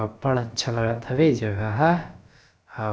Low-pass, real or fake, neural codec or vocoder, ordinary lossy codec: none; fake; codec, 16 kHz, about 1 kbps, DyCAST, with the encoder's durations; none